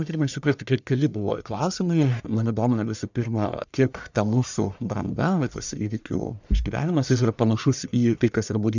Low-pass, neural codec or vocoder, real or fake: 7.2 kHz; codec, 44.1 kHz, 1.7 kbps, Pupu-Codec; fake